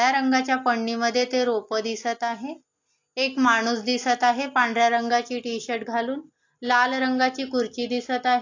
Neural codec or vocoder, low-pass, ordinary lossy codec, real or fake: none; 7.2 kHz; AAC, 48 kbps; real